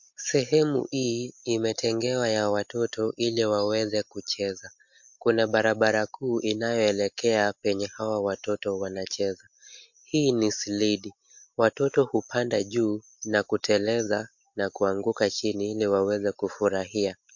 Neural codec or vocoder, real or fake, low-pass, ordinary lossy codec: none; real; 7.2 kHz; MP3, 48 kbps